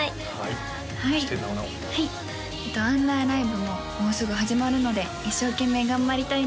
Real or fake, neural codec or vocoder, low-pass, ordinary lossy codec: real; none; none; none